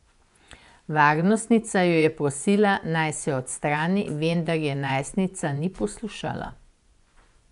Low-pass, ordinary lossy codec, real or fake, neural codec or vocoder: 10.8 kHz; none; fake; vocoder, 24 kHz, 100 mel bands, Vocos